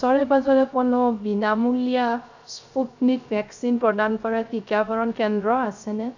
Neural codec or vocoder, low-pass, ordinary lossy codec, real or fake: codec, 16 kHz, 0.3 kbps, FocalCodec; 7.2 kHz; none; fake